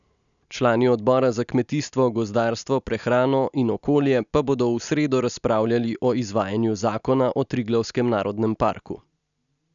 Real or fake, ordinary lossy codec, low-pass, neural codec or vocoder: real; none; 7.2 kHz; none